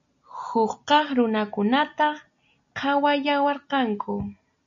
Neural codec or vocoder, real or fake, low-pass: none; real; 7.2 kHz